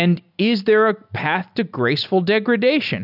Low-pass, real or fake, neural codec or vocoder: 5.4 kHz; real; none